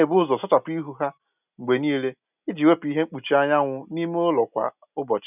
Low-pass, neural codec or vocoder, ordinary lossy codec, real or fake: 3.6 kHz; none; none; real